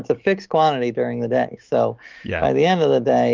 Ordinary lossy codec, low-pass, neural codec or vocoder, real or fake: Opus, 32 kbps; 7.2 kHz; autoencoder, 48 kHz, 128 numbers a frame, DAC-VAE, trained on Japanese speech; fake